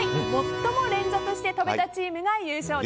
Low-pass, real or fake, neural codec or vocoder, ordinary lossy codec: none; real; none; none